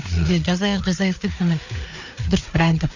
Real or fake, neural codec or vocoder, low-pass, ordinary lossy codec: fake; codec, 16 kHz, 4 kbps, FreqCodec, larger model; 7.2 kHz; none